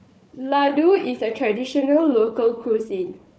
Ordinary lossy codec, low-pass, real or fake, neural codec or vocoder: none; none; fake; codec, 16 kHz, 4 kbps, FunCodec, trained on Chinese and English, 50 frames a second